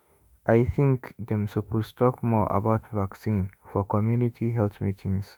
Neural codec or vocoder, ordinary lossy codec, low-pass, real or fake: autoencoder, 48 kHz, 32 numbers a frame, DAC-VAE, trained on Japanese speech; none; none; fake